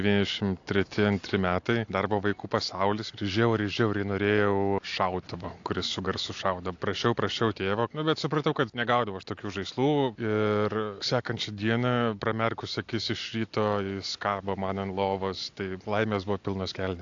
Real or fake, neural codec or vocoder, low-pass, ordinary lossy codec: real; none; 7.2 kHz; AAC, 48 kbps